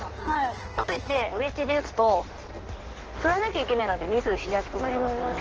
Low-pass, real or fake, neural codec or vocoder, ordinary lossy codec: 7.2 kHz; fake; codec, 16 kHz in and 24 kHz out, 1.1 kbps, FireRedTTS-2 codec; Opus, 16 kbps